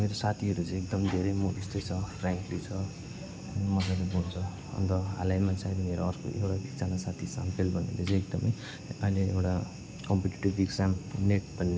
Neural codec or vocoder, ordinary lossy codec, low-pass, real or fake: none; none; none; real